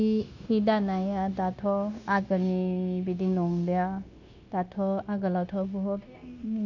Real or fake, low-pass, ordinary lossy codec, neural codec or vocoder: fake; 7.2 kHz; none; codec, 16 kHz, 0.9 kbps, LongCat-Audio-Codec